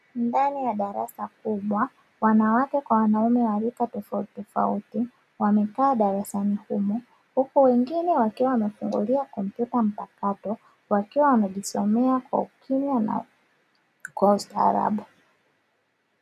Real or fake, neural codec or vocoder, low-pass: real; none; 14.4 kHz